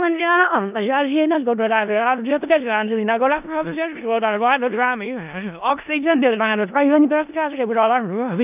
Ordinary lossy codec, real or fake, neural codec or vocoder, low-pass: none; fake; codec, 16 kHz in and 24 kHz out, 0.4 kbps, LongCat-Audio-Codec, four codebook decoder; 3.6 kHz